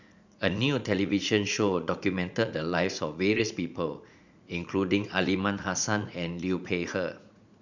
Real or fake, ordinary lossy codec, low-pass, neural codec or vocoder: fake; none; 7.2 kHz; vocoder, 44.1 kHz, 80 mel bands, Vocos